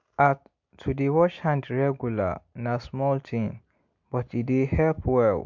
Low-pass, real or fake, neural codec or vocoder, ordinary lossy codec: 7.2 kHz; real; none; MP3, 64 kbps